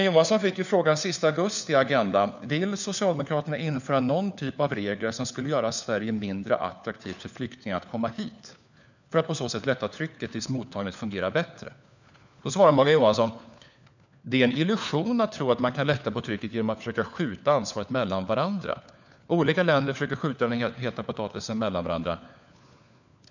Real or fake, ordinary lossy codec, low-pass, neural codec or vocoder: fake; none; 7.2 kHz; codec, 16 kHz, 4 kbps, FunCodec, trained on LibriTTS, 50 frames a second